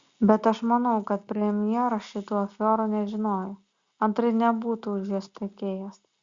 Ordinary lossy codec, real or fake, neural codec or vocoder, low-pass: Opus, 64 kbps; real; none; 7.2 kHz